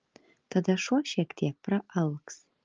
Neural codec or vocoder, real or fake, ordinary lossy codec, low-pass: none; real; Opus, 24 kbps; 7.2 kHz